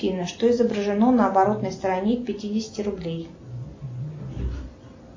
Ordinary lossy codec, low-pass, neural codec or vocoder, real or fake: MP3, 32 kbps; 7.2 kHz; none; real